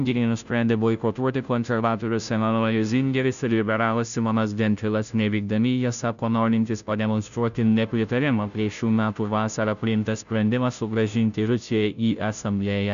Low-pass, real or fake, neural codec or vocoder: 7.2 kHz; fake; codec, 16 kHz, 0.5 kbps, FunCodec, trained on Chinese and English, 25 frames a second